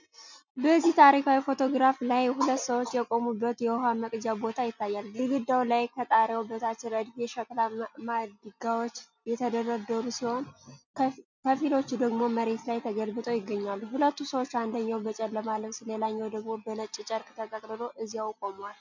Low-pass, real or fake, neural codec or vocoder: 7.2 kHz; real; none